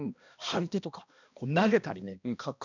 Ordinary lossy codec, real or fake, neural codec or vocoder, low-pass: none; fake; codec, 16 kHz, 1 kbps, X-Codec, HuBERT features, trained on balanced general audio; 7.2 kHz